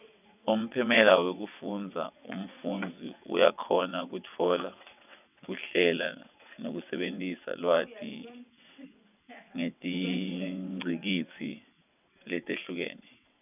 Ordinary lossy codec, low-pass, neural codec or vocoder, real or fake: none; 3.6 kHz; vocoder, 22.05 kHz, 80 mel bands, WaveNeXt; fake